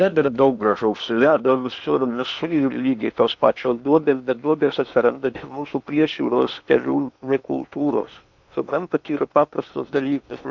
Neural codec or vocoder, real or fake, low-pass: codec, 16 kHz in and 24 kHz out, 0.8 kbps, FocalCodec, streaming, 65536 codes; fake; 7.2 kHz